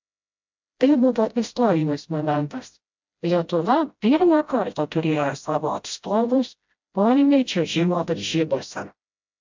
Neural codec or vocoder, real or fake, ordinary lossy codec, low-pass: codec, 16 kHz, 0.5 kbps, FreqCodec, smaller model; fake; MP3, 64 kbps; 7.2 kHz